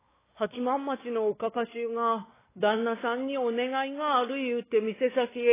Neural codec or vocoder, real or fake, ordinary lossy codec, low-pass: codec, 16 kHz, 4 kbps, X-Codec, WavLM features, trained on Multilingual LibriSpeech; fake; AAC, 16 kbps; 3.6 kHz